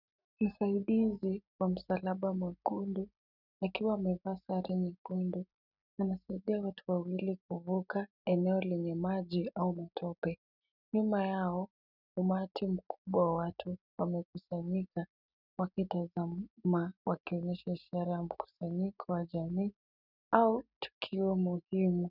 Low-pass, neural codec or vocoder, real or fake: 5.4 kHz; none; real